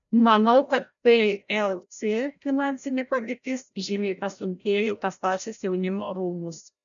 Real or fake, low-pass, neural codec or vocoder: fake; 7.2 kHz; codec, 16 kHz, 0.5 kbps, FreqCodec, larger model